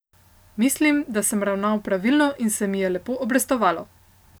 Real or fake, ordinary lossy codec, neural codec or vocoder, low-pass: real; none; none; none